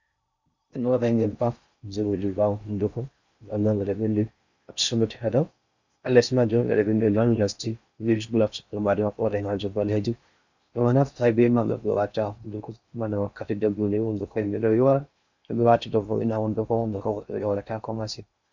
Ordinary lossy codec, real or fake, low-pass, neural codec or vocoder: Opus, 64 kbps; fake; 7.2 kHz; codec, 16 kHz in and 24 kHz out, 0.6 kbps, FocalCodec, streaming, 4096 codes